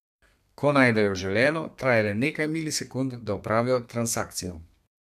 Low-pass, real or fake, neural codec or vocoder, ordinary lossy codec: 14.4 kHz; fake; codec, 32 kHz, 1.9 kbps, SNAC; none